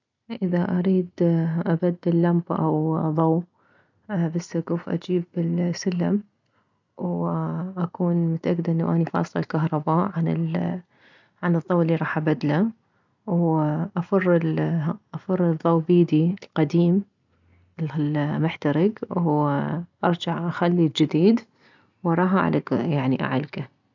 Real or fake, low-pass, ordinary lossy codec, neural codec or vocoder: real; 7.2 kHz; none; none